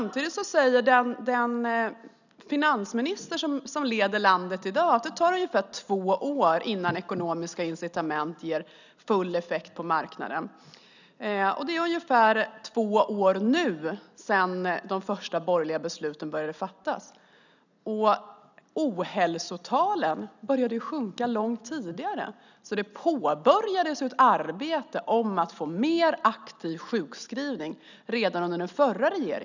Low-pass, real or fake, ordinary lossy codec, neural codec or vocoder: 7.2 kHz; real; none; none